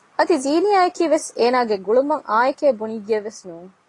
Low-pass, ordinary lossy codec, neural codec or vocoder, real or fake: 10.8 kHz; AAC, 32 kbps; none; real